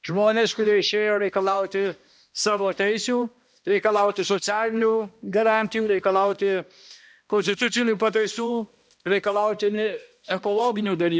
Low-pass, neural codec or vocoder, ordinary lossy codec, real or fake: none; codec, 16 kHz, 1 kbps, X-Codec, HuBERT features, trained on balanced general audio; none; fake